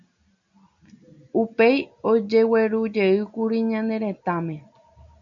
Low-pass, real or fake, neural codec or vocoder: 7.2 kHz; real; none